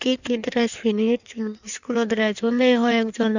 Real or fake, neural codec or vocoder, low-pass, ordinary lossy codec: fake; codec, 16 kHz in and 24 kHz out, 1.1 kbps, FireRedTTS-2 codec; 7.2 kHz; none